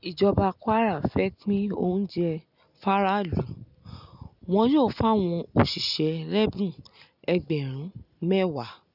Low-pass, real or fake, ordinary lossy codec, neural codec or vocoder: 5.4 kHz; real; none; none